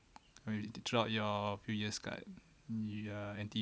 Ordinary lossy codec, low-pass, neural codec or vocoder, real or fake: none; none; none; real